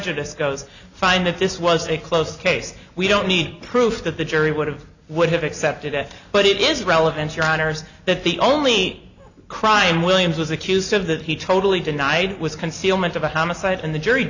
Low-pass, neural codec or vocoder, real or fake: 7.2 kHz; none; real